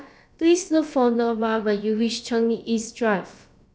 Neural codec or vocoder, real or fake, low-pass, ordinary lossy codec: codec, 16 kHz, about 1 kbps, DyCAST, with the encoder's durations; fake; none; none